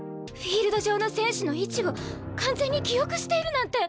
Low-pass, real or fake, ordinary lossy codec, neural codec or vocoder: none; real; none; none